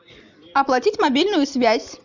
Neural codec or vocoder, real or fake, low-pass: none; real; 7.2 kHz